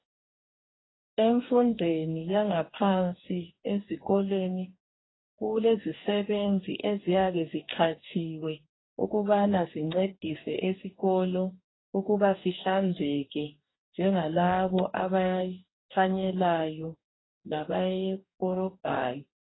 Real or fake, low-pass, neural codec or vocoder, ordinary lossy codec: fake; 7.2 kHz; codec, 44.1 kHz, 2.6 kbps, DAC; AAC, 16 kbps